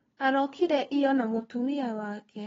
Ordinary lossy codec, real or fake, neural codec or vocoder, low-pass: AAC, 24 kbps; fake; codec, 16 kHz, 2 kbps, FunCodec, trained on LibriTTS, 25 frames a second; 7.2 kHz